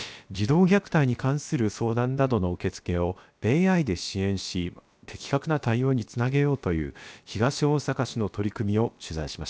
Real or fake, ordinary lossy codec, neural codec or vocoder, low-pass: fake; none; codec, 16 kHz, about 1 kbps, DyCAST, with the encoder's durations; none